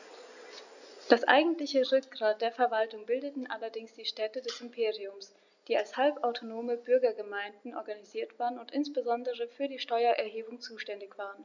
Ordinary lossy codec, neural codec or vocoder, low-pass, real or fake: none; none; 7.2 kHz; real